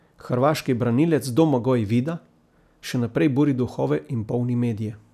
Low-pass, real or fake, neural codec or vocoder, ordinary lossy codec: 14.4 kHz; real; none; none